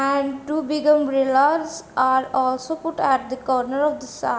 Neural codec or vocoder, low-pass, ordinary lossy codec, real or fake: none; none; none; real